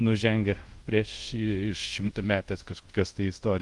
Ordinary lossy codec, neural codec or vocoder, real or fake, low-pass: Opus, 24 kbps; codec, 24 kHz, 0.5 kbps, DualCodec; fake; 10.8 kHz